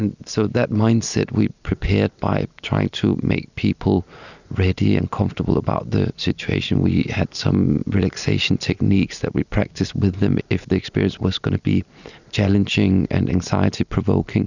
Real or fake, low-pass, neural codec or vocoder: real; 7.2 kHz; none